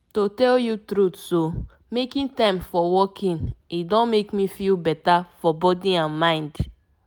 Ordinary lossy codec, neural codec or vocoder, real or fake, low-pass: none; none; real; none